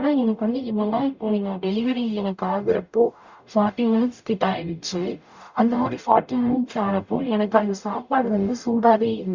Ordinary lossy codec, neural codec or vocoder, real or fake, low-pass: Opus, 64 kbps; codec, 44.1 kHz, 0.9 kbps, DAC; fake; 7.2 kHz